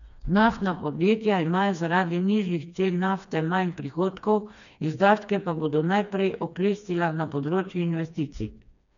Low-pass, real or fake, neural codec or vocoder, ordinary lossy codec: 7.2 kHz; fake; codec, 16 kHz, 2 kbps, FreqCodec, smaller model; none